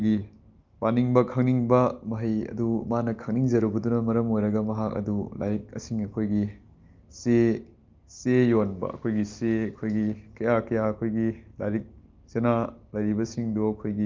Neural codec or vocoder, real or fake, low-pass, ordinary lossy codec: none; real; 7.2 kHz; Opus, 24 kbps